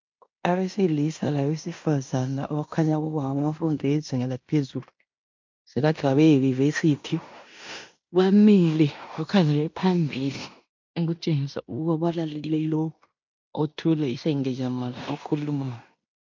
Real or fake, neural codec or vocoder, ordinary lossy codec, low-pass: fake; codec, 16 kHz in and 24 kHz out, 0.9 kbps, LongCat-Audio-Codec, fine tuned four codebook decoder; MP3, 64 kbps; 7.2 kHz